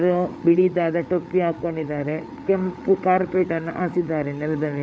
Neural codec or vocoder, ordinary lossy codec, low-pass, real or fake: codec, 16 kHz, 8 kbps, FreqCodec, larger model; none; none; fake